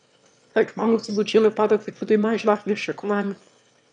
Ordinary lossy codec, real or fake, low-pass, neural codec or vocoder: none; fake; 9.9 kHz; autoencoder, 22.05 kHz, a latent of 192 numbers a frame, VITS, trained on one speaker